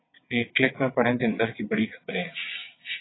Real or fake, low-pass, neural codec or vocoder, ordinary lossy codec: fake; 7.2 kHz; vocoder, 22.05 kHz, 80 mel bands, WaveNeXt; AAC, 16 kbps